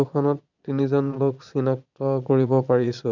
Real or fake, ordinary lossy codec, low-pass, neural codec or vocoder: fake; none; 7.2 kHz; vocoder, 22.05 kHz, 80 mel bands, Vocos